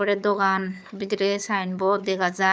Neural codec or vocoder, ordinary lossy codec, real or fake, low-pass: codec, 16 kHz, 4 kbps, FunCodec, trained on Chinese and English, 50 frames a second; none; fake; none